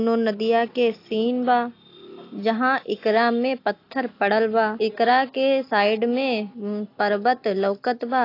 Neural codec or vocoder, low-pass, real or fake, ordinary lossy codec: none; 5.4 kHz; real; AAC, 32 kbps